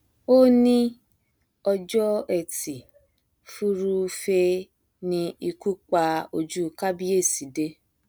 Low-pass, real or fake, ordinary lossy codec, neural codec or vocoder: none; real; none; none